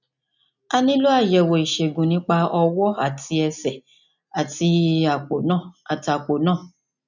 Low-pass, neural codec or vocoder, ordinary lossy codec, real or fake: 7.2 kHz; none; none; real